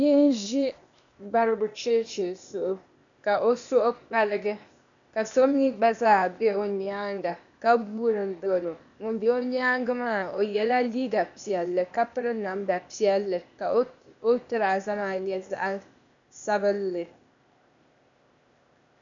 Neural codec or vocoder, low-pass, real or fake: codec, 16 kHz, 0.8 kbps, ZipCodec; 7.2 kHz; fake